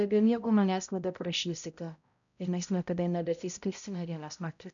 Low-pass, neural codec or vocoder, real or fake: 7.2 kHz; codec, 16 kHz, 0.5 kbps, X-Codec, HuBERT features, trained on balanced general audio; fake